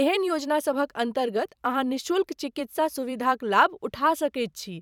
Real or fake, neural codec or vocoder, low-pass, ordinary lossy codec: fake; vocoder, 44.1 kHz, 128 mel bands every 256 samples, BigVGAN v2; 19.8 kHz; none